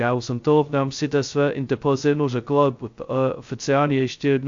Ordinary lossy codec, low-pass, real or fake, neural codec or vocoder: AAC, 64 kbps; 7.2 kHz; fake; codec, 16 kHz, 0.2 kbps, FocalCodec